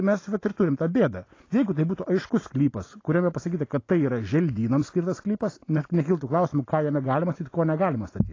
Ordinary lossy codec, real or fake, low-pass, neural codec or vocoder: AAC, 32 kbps; real; 7.2 kHz; none